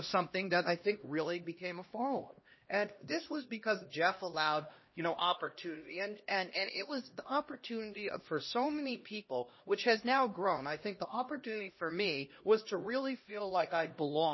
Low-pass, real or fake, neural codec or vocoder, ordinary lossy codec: 7.2 kHz; fake; codec, 16 kHz, 1 kbps, X-Codec, HuBERT features, trained on LibriSpeech; MP3, 24 kbps